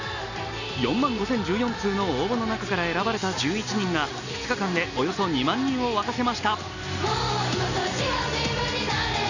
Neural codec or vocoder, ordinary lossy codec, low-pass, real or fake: none; none; 7.2 kHz; real